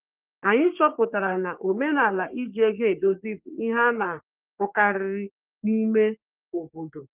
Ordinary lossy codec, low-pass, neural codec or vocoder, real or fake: Opus, 32 kbps; 3.6 kHz; codec, 44.1 kHz, 3.4 kbps, Pupu-Codec; fake